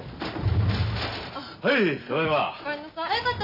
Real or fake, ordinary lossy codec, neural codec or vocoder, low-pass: real; none; none; 5.4 kHz